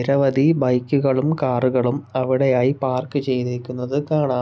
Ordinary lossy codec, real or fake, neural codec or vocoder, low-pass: none; real; none; none